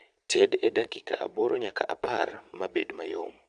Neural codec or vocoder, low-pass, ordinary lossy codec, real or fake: vocoder, 22.05 kHz, 80 mel bands, Vocos; 9.9 kHz; none; fake